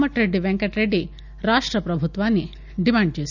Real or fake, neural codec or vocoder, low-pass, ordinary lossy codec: real; none; 7.2 kHz; none